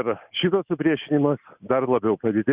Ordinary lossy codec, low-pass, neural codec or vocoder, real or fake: Opus, 64 kbps; 3.6 kHz; none; real